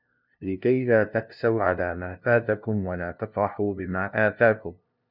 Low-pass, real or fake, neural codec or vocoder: 5.4 kHz; fake; codec, 16 kHz, 0.5 kbps, FunCodec, trained on LibriTTS, 25 frames a second